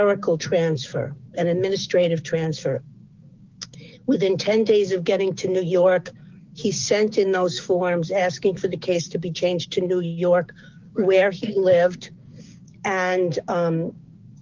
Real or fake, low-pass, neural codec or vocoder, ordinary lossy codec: fake; 7.2 kHz; codec, 16 kHz, 6 kbps, DAC; Opus, 32 kbps